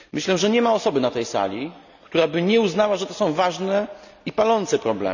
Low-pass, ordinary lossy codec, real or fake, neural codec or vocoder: 7.2 kHz; none; real; none